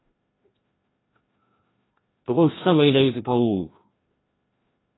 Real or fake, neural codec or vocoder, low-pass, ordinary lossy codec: fake; codec, 16 kHz, 1 kbps, FreqCodec, larger model; 7.2 kHz; AAC, 16 kbps